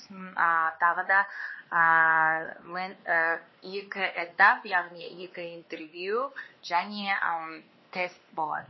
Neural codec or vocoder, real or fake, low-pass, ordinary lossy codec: codec, 16 kHz, 2 kbps, X-Codec, WavLM features, trained on Multilingual LibriSpeech; fake; 7.2 kHz; MP3, 24 kbps